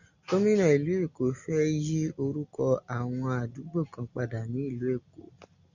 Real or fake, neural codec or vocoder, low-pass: real; none; 7.2 kHz